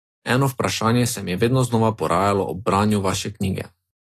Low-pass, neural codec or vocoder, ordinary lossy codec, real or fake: 14.4 kHz; none; AAC, 48 kbps; real